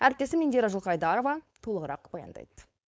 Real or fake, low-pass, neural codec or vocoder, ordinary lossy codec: fake; none; codec, 16 kHz, 4.8 kbps, FACodec; none